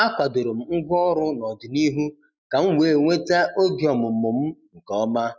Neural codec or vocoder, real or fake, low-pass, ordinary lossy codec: none; real; none; none